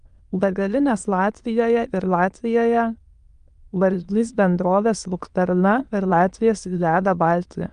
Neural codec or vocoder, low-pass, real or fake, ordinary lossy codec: autoencoder, 22.05 kHz, a latent of 192 numbers a frame, VITS, trained on many speakers; 9.9 kHz; fake; Opus, 32 kbps